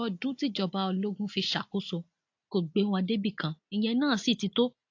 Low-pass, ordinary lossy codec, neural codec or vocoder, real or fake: 7.2 kHz; AAC, 48 kbps; none; real